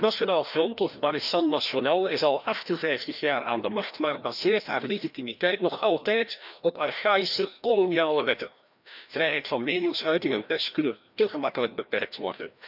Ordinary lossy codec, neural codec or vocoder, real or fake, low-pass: none; codec, 16 kHz, 1 kbps, FreqCodec, larger model; fake; 5.4 kHz